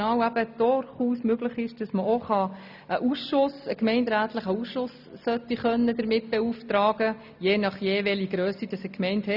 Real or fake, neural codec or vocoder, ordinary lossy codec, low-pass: real; none; none; 5.4 kHz